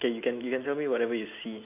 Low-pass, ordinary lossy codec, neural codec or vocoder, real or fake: 3.6 kHz; none; none; real